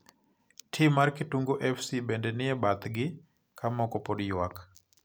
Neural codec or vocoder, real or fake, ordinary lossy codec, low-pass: none; real; none; none